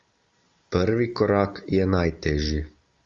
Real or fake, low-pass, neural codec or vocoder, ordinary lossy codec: real; 7.2 kHz; none; Opus, 32 kbps